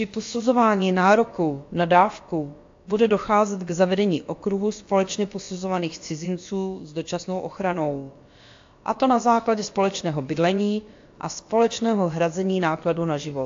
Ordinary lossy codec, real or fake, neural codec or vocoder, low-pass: AAC, 48 kbps; fake; codec, 16 kHz, about 1 kbps, DyCAST, with the encoder's durations; 7.2 kHz